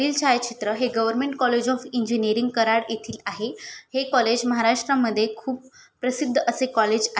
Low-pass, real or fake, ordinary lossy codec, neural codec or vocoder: none; real; none; none